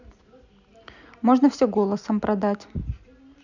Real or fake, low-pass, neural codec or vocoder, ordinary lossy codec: real; 7.2 kHz; none; none